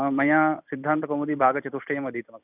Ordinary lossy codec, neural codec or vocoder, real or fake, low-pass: none; vocoder, 44.1 kHz, 128 mel bands every 256 samples, BigVGAN v2; fake; 3.6 kHz